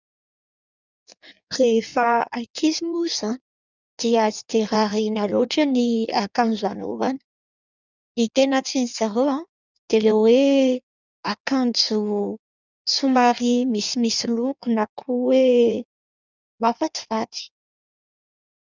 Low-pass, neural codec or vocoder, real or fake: 7.2 kHz; codec, 16 kHz in and 24 kHz out, 1.1 kbps, FireRedTTS-2 codec; fake